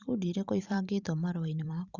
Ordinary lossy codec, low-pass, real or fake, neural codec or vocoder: Opus, 64 kbps; 7.2 kHz; fake; vocoder, 44.1 kHz, 128 mel bands every 512 samples, BigVGAN v2